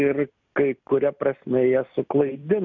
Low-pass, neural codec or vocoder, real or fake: 7.2 kHz; none; real